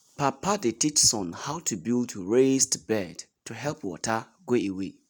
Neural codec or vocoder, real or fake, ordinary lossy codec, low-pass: none; real; none; none